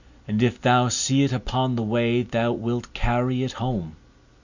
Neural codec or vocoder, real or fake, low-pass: none; real; 7.2 kHz